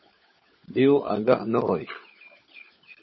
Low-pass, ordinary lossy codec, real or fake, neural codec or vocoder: 7.2 kHz; MP3, 24 kbps; fake; codec, 16 kHz, 16 kbps, FunCodec, trained on LibriTTS, 50 frames a second